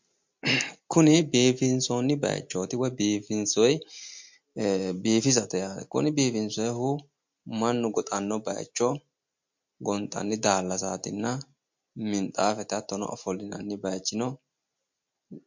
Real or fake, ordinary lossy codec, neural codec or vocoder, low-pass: real; MP3, 48 kbps; none; 7.2 kHz